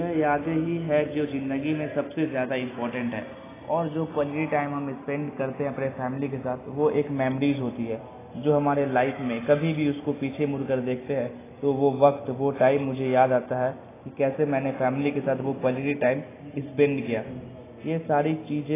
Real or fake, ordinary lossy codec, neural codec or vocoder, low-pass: real; AAC, 16 kbps; none; 3.6 kHz